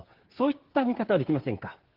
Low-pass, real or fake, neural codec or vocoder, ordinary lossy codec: 5.4 kHz; fake; codec, 16 kHz, 8 kbps, FreqCodec, smaller model; Opus, 16 kbps